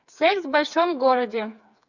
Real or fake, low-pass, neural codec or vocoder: fake; 7.2 kHz; codec, 16 kHz, 4 kbps, FreqCodec, smaller model